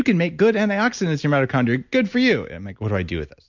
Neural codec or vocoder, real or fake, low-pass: none; real; 7.2 kHz